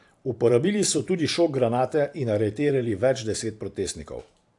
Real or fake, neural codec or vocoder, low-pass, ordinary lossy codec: fake; vocoder, 44.1 kHz, 128 mel bands every 512 samples, BigVGAN v2; 10.8 kHz; AAC, 64 kbps